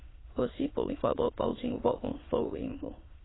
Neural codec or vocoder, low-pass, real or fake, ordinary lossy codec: autoencoder, 22.05 kHz, a latent of 192 numbers a frame, VITS, trained on many speakers; 7.2 kHz; fake; AAC, 16 kbps